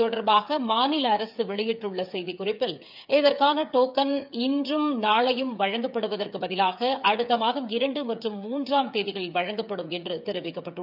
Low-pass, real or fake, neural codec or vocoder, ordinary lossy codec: 5.4 kHz; fake; codec, 16 kHz, 8 kbps, FreqCodec, smaller model; none